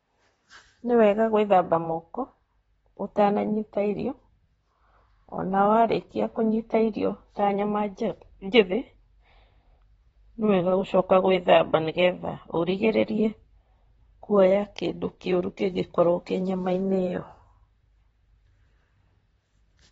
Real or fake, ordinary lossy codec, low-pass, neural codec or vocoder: fake; AAC, 24 kbps; 19.8 kHz; vocoder, 44.1 kHz, 128 mel bands, Pupu-Vocoder